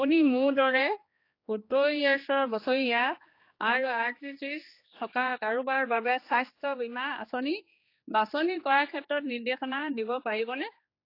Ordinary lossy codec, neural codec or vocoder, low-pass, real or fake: AAC, 32 kbps; codec, 16 kHz, 2 kbps, X-Codec, HuBERT features, trained on general audio; 5.4 kHz; fake